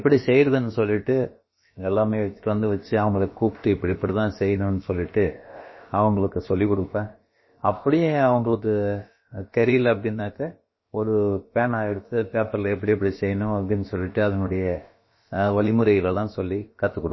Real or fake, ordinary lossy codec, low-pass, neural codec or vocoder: fake; MP3, 24 kbps; 7.2 kHz; codec, 16 kHz, about 1 kbps, DyCAST, with the encoder's durations